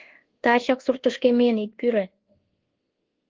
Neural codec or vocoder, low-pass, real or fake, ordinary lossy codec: codec, 24 kHz, 1.2 kbps, DualCodec; 7.2 kHz; fake; Opus, 16 kbps